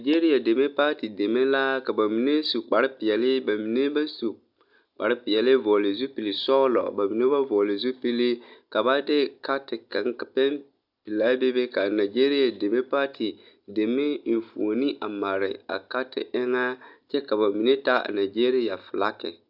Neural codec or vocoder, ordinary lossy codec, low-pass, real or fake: none; AAC, 48 kbps; 5.4 kHz; real